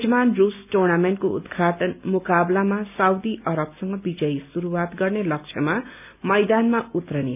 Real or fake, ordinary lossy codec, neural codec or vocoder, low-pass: real; none; none; 3.6 kHz